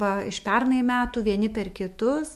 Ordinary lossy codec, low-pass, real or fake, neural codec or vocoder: MP3, 64 kbps; 14.4 kHz; fake; autoencoder, 48 kHz, 128 numbers a frame, DAC-VAE, trained on Japanese speech